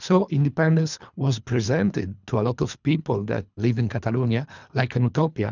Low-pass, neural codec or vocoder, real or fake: 7.2 kHz; codec, 24 kHz, 3 kbps, HILCodec; fake